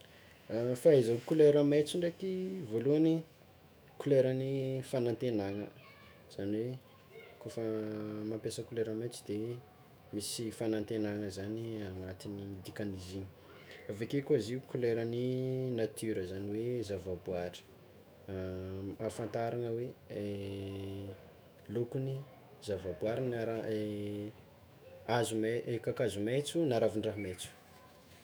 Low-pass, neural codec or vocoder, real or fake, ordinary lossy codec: none; autoencoder, 48 kHz, 128 numbers a frame, DAC-VAE, trained on Japanese speech; fake; none